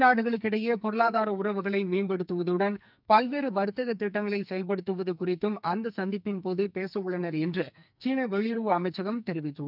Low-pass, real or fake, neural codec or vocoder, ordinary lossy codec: 5.4 kHz; fake; codec, 44.1 kHz, 2.6 kbps, SNAC; none